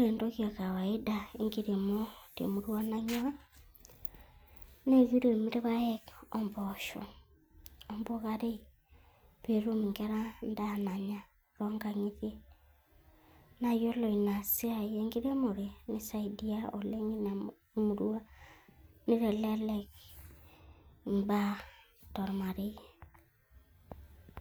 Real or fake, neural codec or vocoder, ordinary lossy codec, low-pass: real; none; none; none